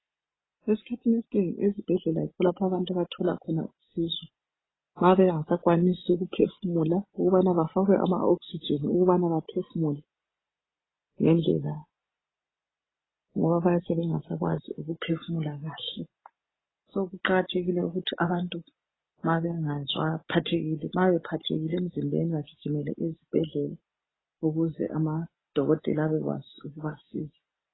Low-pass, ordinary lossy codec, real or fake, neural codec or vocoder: 7.2 kHz; AAC, 16 kbps; real; none